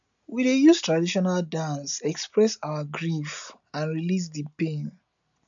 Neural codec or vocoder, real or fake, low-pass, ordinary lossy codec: none; real; 7.2 kHz; none